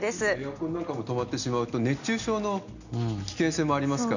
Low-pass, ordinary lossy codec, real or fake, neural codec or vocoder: 7.2 kHz; none; real; none